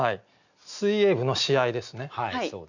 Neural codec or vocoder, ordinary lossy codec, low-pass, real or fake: none; none; 7.2 kHz; real